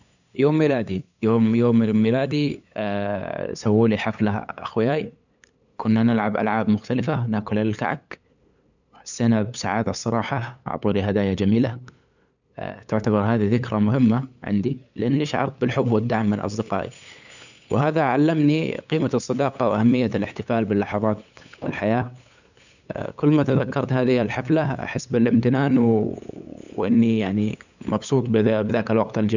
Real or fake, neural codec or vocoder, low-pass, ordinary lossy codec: fake; codec, 16 kHz, 8 kbps, FunCodec, trained on LibriTTS, 25 frames a second; 7.2 kHz; none